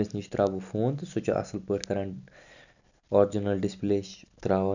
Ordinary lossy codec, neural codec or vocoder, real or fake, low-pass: none; none; real; 7.2 kHz